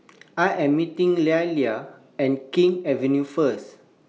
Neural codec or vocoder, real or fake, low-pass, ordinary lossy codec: none; real; none; none